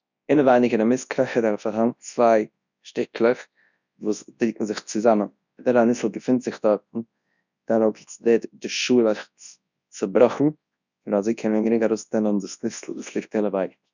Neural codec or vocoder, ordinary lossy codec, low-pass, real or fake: codec, 24 kHz, 0.9 kbps, WavTokenizer, large speech release; none; 7.2 kHz; fake